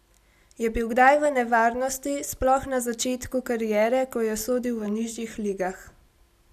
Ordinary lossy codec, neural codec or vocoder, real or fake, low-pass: none; none; real; 14.4 kHz